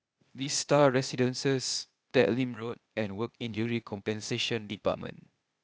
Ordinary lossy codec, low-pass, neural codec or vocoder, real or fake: none; none; codec, 16 kHz, 0.8 kbps, ZipCodec; fake